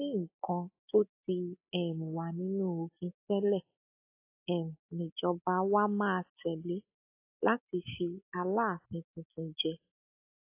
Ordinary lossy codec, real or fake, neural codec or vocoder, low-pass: AAC, 24 kbps; real; none; 3.6 kHz